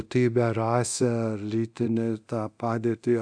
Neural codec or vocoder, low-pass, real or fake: codec, 24 kHz, 0.9 kbps, DualCodec; 9.9 kHz; fake